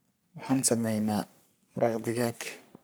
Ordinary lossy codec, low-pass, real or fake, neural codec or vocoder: none; none; fake; codec, 44.1 kHz, 3.4 kbps, Pupu-Codec